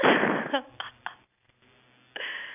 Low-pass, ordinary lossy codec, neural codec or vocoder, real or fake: 3.6 kHz; none; none; real